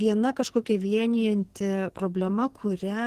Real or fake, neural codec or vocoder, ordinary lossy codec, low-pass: fake; codec, 44.1 kHz, 2.6 kbps, SNAC; Opus, 16 kbps; 14.4 kHz